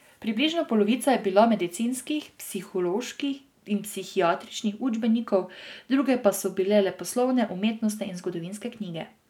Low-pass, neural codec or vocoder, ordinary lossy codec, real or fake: 19.8 kHz; none; none; real